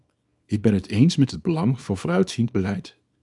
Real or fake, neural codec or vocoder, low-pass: fake; codec, 24 kHz, 0.9 kbps, WavTokenizer, small release; 10.8 kHz